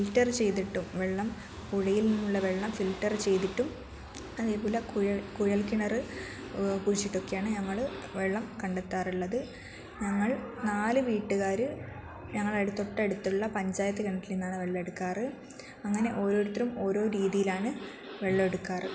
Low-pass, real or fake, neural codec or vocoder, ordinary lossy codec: none; real; none; none